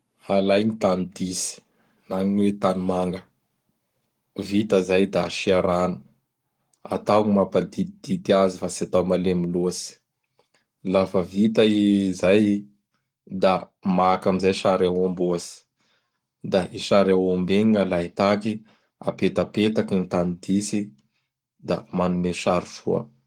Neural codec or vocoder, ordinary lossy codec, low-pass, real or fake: codec, 44.1 kHz, 7.8 kbps, Pupu-Codec; Opus, 24 kbps; 19.8 kHz; fake